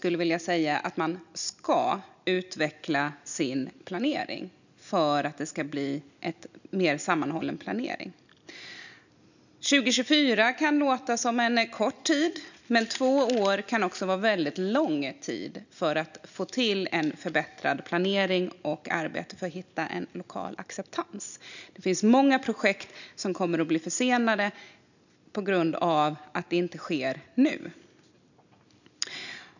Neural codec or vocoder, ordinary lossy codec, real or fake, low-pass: none; none; real; 7.2 kHz